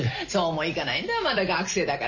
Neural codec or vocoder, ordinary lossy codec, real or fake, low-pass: none; none; real; 7.2 kHz